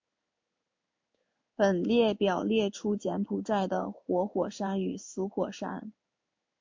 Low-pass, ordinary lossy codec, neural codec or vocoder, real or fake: 7.2 kHz; MP3, 48 kbps; codec, 16 kHz in and 24 kHz out, 1 kbps, XY-Tokenizer; fake